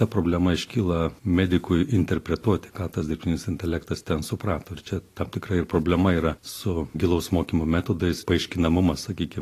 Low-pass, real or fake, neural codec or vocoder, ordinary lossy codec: 14.4 kHz; real; none; AAC, 48 kbps